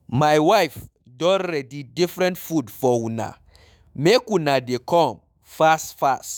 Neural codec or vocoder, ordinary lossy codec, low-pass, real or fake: autoencoder, 48 kHz, 128 numbers a frame, DAC-VAE, trained on Japanese speech; none; none; fake